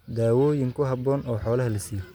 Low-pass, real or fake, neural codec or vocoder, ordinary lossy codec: none; real; none; none